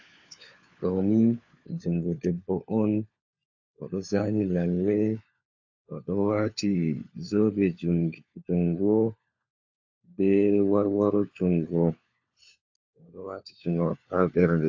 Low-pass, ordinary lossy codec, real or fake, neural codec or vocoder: 7.2 kHz; AAC, 48 kbps; fake; codec, 16 kHz, 4 kbps, FunCodec, trained on LibriTTS, 50 frames a second